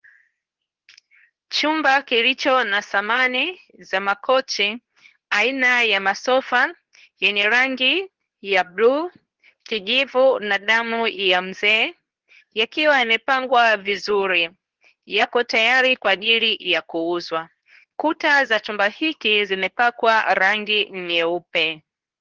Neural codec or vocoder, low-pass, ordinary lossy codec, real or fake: codec, 24 kHz, 0.9 kbps, WavTokenizer, medium speech release version 2; 7.2 kHz; Opus, 16 kbps; fake